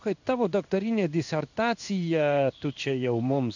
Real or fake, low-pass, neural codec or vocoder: fake; 7.2 kHz; codec, 16 kHz in and 24 kHz out, 1 kbps, XY-Tokenizer